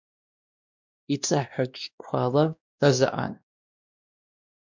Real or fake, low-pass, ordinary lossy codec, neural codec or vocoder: fake; 7.2 kHz; MP3, 64 kbps; codec, 16 kHz, 1 kbps, X-Codec, WavLM features, trained on Multilingual LibriSpeech